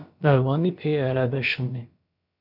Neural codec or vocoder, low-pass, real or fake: codec, 16 kHz, about 1 kbps, DyCAST, with the encoder's durations; 5.4 kHz; fake